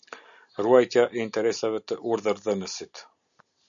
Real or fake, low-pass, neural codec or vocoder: real; 7.2 kHz; none